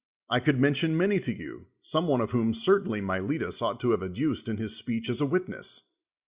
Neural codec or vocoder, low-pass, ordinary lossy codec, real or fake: none; 3.6 kHz; Opus, 64 kbps; real